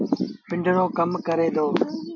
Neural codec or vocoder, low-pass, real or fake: none; 7.2 kHz; real